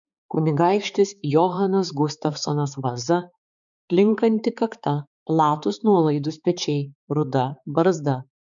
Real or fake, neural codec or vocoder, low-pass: fake; codec, 16 kHz, 4 kbps, X-Codec, HuBERT features, trained on balanced general audio; 7.2 kHz